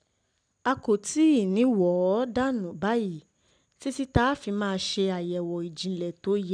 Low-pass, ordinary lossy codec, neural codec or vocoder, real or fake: 9.9 kHz; none; none; real